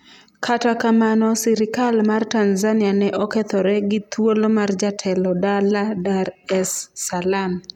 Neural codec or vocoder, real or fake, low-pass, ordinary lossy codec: none; real; 19.8 kHz; none